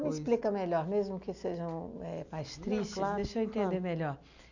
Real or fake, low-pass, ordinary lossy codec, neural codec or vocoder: real; 7.2 kHz; none; none